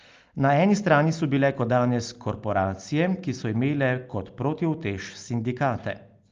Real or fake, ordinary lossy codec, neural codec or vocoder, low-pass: real; Opus, 24 kbps; none; 7.2 kHz